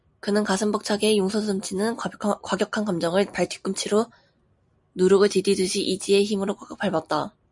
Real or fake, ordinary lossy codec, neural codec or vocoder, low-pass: real; MP3, 96 kbps; none; 10.8 kHz